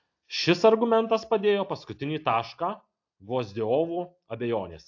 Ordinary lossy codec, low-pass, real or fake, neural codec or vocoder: AAC, 48 kbps; 7.2 kHz; real; none